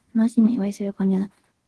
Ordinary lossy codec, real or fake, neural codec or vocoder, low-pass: Opus, 16 kbps; fake; codec, 24 kHz, 0.5 kbps, DualCodec; 10.8 kHz